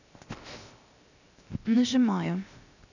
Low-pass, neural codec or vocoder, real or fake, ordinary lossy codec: 7.2 kHz; codec, 16 kHz, 0.7 kbps, FocalCodec; fake; none